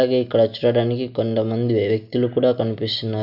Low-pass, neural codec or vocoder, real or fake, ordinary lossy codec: 5.4 kHz; none; real; none